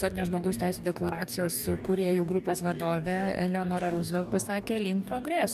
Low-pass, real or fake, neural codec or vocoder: 14.4 kHz; fake; codec, 44.1 kHz, 2.6 kbps, DAC